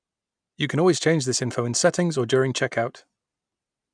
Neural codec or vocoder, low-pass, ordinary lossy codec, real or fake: none; 9.9 kHz; MP3, 96 kbps; real